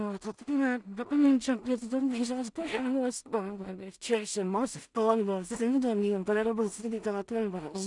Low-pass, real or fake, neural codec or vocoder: 10.8 kHz; fake; codec, 16 kHz in and 24 kHz out, 0.4 kbps, LongCat-Audio-Codec, two codebook decoder